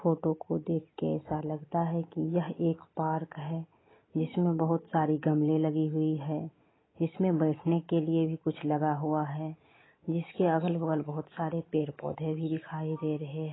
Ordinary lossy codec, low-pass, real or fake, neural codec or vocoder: AAC, 16 kbps; 7.2 kHz; real; none